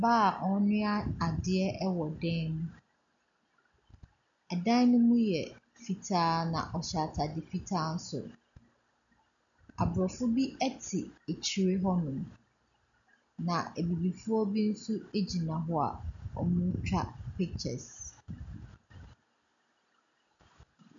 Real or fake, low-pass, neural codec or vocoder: real; 7.2 kHz; none